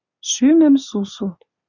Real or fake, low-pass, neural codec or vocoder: real; 7.2 kHz; none